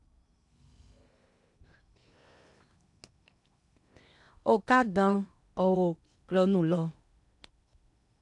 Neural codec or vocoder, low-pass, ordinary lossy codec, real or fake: codec, 16 kHz in and 24 kHz out, 0.8 kbps, FocalCodec, streaming, 65536 codes; 10.8 kHz; none; fake